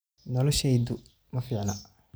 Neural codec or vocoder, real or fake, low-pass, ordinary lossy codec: none; real; none; none